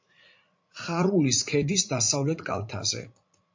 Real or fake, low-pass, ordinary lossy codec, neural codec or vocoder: fake; 7.2 kHz; MP3, 48 kbps; vocoder, 44.1 kHz, 80 mel bands, Vocos